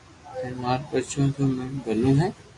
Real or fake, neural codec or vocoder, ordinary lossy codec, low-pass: real; none; AAC, 48 kbps; 10.8 kHz